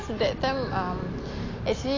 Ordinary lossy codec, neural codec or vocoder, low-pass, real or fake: AAC, 32 kbps; none; 7.2 kHz; real